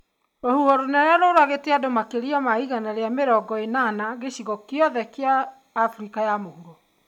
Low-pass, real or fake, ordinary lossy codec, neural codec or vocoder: 19.8 kHz; real; none; none